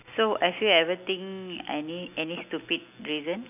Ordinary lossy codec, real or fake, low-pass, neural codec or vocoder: none; real; 3.6 kHz; none